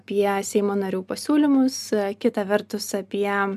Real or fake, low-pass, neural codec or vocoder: real; 14.4 kHz; none